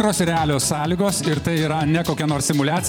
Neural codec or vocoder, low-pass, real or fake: vocoder, 44.1 kHz, 128 mel bands every 512 samples, BigVGAN v2; 19.8 kHz; fake